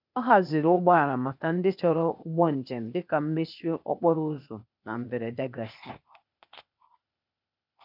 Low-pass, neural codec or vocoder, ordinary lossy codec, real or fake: 5.4 kHz; codec, 16 kHz, 0.8 kbps, ZipCodec; none; fake